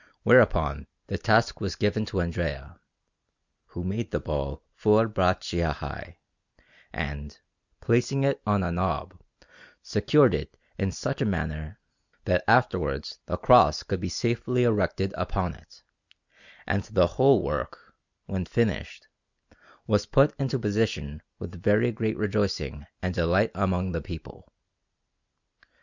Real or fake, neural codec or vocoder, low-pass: real; none; 7.2 kHz